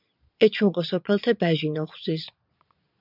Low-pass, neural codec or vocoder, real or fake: 5.4 kHz; vocoder, 22.05 kHz, 80 mel bands, Vocos; fake